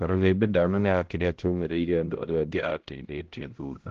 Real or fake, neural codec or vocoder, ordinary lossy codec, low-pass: fake; codec, 16 kHz, 0.5 kbps, X-Codec, HuBERT features, trained on balanced general audio; Opus, 16 kbps; 7.2 kHz